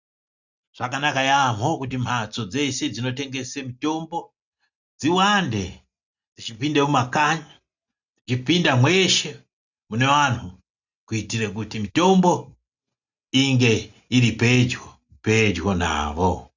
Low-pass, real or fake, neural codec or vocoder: 7.2 kHz; real; none